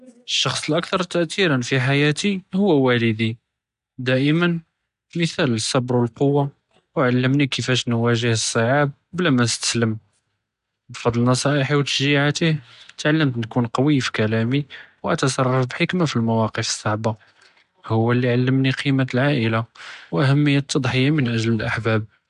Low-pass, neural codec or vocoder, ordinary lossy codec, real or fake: 10.8 kHz; none; AAC, 96 kbps; real